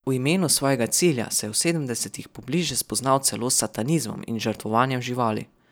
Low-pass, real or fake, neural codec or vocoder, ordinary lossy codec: none; real; none; none